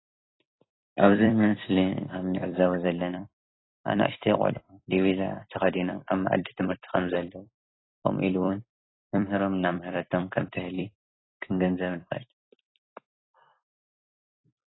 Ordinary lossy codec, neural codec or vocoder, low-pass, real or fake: AAC, 16 kbps; vocoder, 44.1 kHz, 128 mel bands every 256 samples, BigVGAN v2; 7.2 kHz; fake